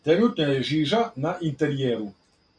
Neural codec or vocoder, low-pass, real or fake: none; 9.9 kHz; real